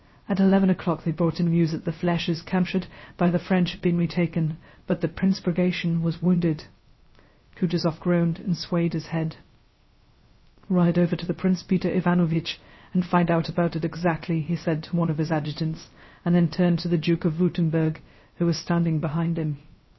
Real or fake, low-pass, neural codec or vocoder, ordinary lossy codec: fake; 7.2 kHz; codec, 16 kHz, 0.3 kbps, FocalCodec; MP3, 24 kbps